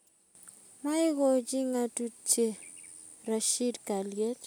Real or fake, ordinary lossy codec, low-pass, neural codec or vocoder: real; none; none; none